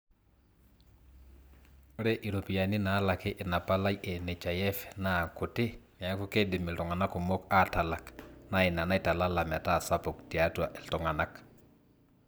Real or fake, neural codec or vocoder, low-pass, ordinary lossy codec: real; none; none; none